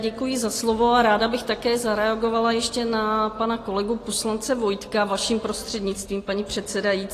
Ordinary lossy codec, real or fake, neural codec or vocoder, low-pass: AAC, 48 kbps; real; none; 14.4 kHz